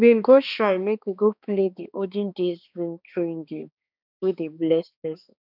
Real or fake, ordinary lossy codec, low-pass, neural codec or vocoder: fake; none; 5.4 kHz; codec, 16 kHz, 2 kbps, X-Codec, HuBERT features, trained on balanced general audio